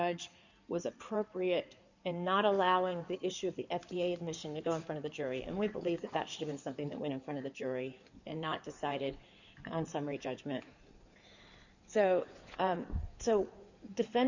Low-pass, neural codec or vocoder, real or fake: 7.2 kHz; codec, 16 kHz in and 24 kHz out, 2.2 kbps, FireRedTTS-2 codec; fake